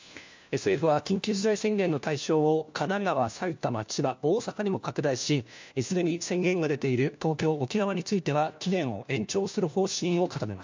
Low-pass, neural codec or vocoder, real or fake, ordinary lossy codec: 7.2 kHz; codec, 16 kHz, 1 kbps, FunCodec, trained on LibriTTS, 50 frames a second; fake; MP3, 64 kbps